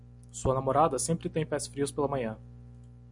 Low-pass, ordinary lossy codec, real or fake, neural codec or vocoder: 10.8 kHz; MP3, 96 kbps; real; none